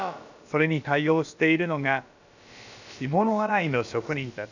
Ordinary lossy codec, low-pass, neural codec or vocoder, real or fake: none; 7.2 kHz; codec, 16 kHz, about 1 kbps, DyCAST, with the encoder's durations; fake